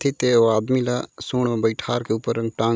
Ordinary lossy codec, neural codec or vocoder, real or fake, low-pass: none; none; real; none